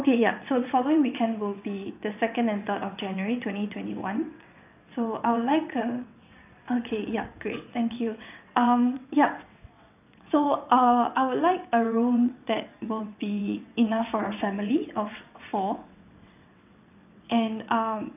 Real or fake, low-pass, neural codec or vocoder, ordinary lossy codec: fake; 3.6 kHz; vocoder, 22.05 kHz, 80 mel bands, WaveNeXt; none